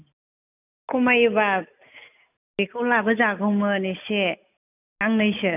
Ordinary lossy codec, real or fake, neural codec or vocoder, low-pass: none; real; none; 3.6 kHz